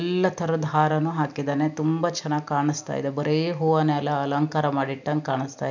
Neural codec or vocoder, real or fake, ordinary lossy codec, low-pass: none; real; none; 7.2 kHz